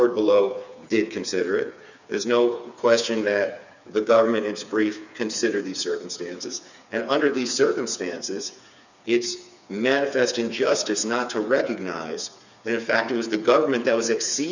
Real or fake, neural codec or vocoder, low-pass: fake; codec, 16 kHz, 4 kbps, FreqCodec, smaller model; 7.2 kHz